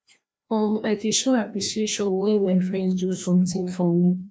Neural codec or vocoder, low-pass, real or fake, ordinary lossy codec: codec, 16 kHz, 1 kbps, FreqCodec, larger model; none; fake; none